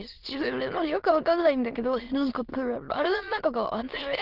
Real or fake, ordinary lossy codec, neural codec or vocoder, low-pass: fake; Opus, 16 kbps; autoencoder, 22.05 kHz, a latent of 192 numbers a frame, VITS, trained on many speakers; 5.4 kHz